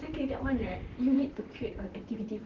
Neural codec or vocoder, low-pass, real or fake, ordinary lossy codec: vocoder, 44.1 kHz, 128 mel bands, Pupu-Vocoder; 7.2 kHz; fake; Opus, 16 kbps